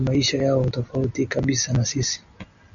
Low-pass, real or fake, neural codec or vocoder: 7.2 kHz; real; none